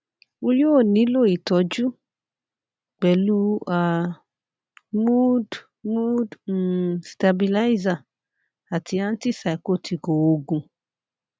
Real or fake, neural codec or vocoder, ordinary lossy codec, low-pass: real; none; none; none